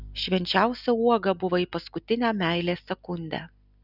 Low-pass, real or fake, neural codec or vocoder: 5.4 kHz; real; none